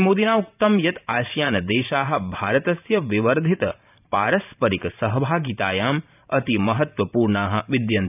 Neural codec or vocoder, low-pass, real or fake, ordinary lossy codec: vocoder, 44.1 kHz, 128 mel bands every 512 samples, BigVGAN v2; 3.6 kHz; fake; none